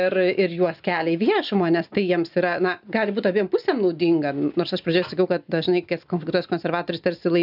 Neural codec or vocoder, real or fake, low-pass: none; real; 5.4 kHz